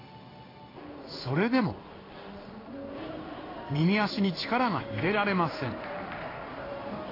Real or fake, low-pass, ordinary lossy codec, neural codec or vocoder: fake; 5.4 kHz; AAC, 24 kbps; codec, 16 kHz in and 24 kHz out, 1 kbps, XY-Tokenizer